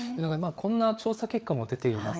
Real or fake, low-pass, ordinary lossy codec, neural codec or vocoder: fake; none; none; codec, 16 kHz, 4 kbps, FreqCodec, larger model